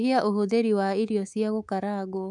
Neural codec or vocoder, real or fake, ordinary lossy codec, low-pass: codec, 24 kHz, 3.1 kbps, DualCodec; fake; none; 10.8 kHz